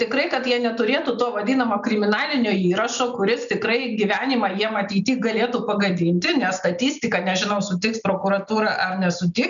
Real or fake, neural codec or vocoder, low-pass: real; none; 7.2 kHz